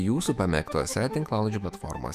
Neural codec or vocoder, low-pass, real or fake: autoencoder, 48 kHz, 128 numbers a frame, DAC-VAE, trained on Japanese speech; 14.4 kHz; fake